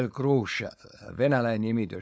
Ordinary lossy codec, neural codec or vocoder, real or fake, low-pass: none; codec, 16 kHz, 4.8 kbps, FACodec; fake; none